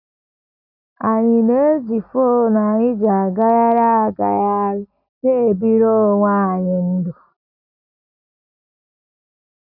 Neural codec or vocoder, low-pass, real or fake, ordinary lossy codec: none; 5.4 kHz; real; none